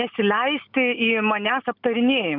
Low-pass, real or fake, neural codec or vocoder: 5.4 kHz; real; none